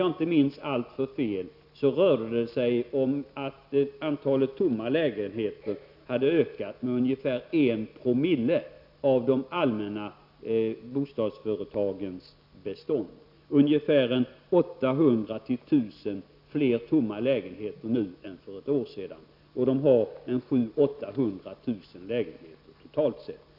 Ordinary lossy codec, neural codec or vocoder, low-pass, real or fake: none; none; 5.4 kHz; real